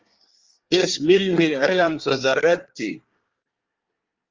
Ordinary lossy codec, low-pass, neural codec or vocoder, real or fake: Opus, 32 kbps; 7.2 kHz; codec, 24 kHz, 1 kbps, SNAC; fake